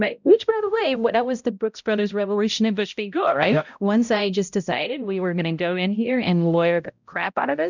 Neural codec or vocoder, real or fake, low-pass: codec, 16 kHz, 0.5 kbps, X-Codec, HuBERT features, trained on balanced general audio; fake; 7.2 kHz